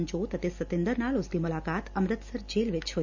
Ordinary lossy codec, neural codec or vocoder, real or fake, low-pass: none; none; real; 7.2 kHz